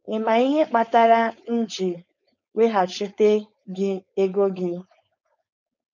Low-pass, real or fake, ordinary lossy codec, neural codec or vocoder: 7.2 kHz; fake; none; codec, 16 kHz, 4.8 kbps, FACodec